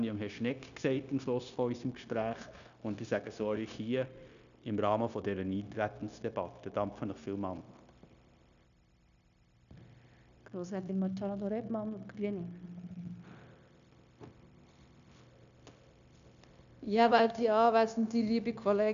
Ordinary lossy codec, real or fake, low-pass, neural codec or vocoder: none; fake; 7.2 kHz; codec, 16 kHz, 0.9 kbps, LongCat-Audio-Codec